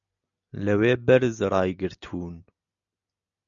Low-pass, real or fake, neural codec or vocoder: 7.2 kHz; real; none